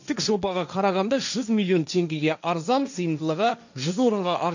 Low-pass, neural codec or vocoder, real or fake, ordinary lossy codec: 7.2 kHz; codec, 16 kHz, 1.1 kbps, Voila-Tokenizer; fake; none